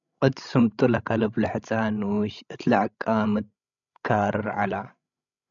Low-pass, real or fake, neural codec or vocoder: 7.2 kHz; fake; codec, 16 kHz, 16 kbps, FreqCodec, larger model